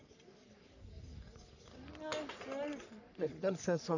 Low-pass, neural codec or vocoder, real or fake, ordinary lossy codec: 7.2 kHz; codec, 44.1 kHz, 3.4 kbps, Pupu-Codec; fake; none